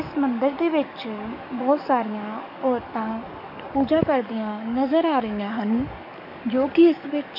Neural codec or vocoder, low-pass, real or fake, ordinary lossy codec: codec, 44.1 kHz, 7.8 kbps, DAC; 5.4 kHz; fake; none